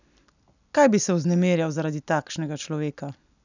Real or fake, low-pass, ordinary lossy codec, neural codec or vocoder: real; 7.2 kHz; none; none